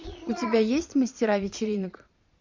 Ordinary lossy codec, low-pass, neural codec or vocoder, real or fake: MP3, 64 kbps; 7.2 kHz; vocoder, 22.05 kHz, 80 mel bands, Vocos; fake